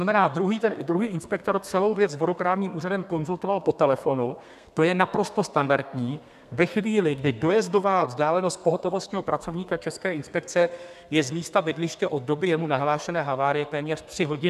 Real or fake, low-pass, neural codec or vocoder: fake; 14.4 kHz; codec, 32 kHz, 1.9 kbps, SNAC